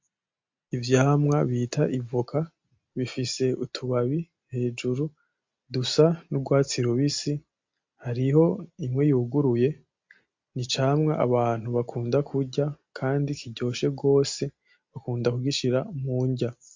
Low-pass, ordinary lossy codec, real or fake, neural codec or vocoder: 7.2 kHz; MP3, 48 kbps; real; none